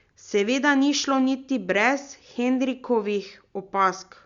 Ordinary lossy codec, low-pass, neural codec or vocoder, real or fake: Opus, 64 kbps; 7.2 kHz; none; real